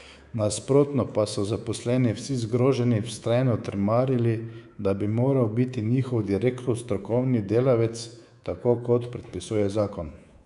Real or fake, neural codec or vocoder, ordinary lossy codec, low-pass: fake; codec, 24 kHz, 3.1 kbps, DualCodec; none; 10.8 kHz